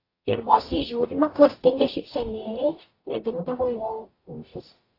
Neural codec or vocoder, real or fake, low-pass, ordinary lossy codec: codec, 44.1 kHz, 0.9 kbps, DAC; fake; 5.4 kHz; AAC, 24 kbps